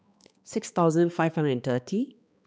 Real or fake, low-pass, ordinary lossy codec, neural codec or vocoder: fake; none; none; codec, 16 kHz, 2 kbps, X-Codec, HuBERT features, trained on balanced general audio